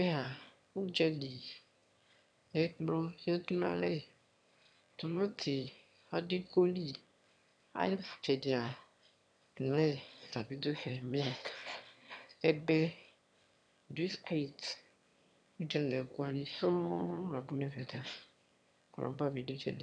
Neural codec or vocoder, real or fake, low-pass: autoencoder, 22.05 kHz, a latent of 192 numbers a frame, VITS, trained on one speaker; fake; 9.9 kHz